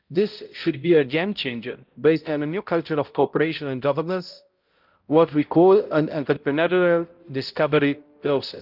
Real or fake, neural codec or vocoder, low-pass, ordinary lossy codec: fake; codec, 16 kHz, 0.5 kbps, X-Codec, HuBERT features, trained on balanced general audio; 5.4 kHz; Opus, 24 kbps